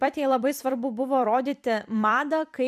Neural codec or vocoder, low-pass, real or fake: vocoder, 44.1 kHz, 128 mel bands every 256 samples, BigVGAN v2; 14.4 kHz; fake